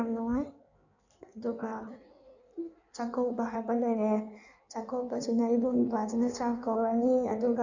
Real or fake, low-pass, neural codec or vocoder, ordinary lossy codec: fake; 7.2 kHz; codec, 16 kHz in and 24 kHz out, 1.1 kbps, FireRedTTS-2 codec; none